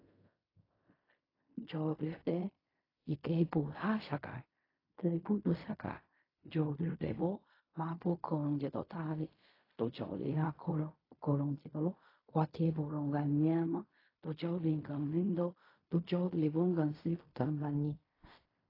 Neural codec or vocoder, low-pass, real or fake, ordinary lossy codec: codec, 16 kHz in and 24 kHz out, 0.4 kbps, LongCat-Audio-Codec, fine tuned four codebook decoder; 5.4 kHz; fake; AAC, 24 kbps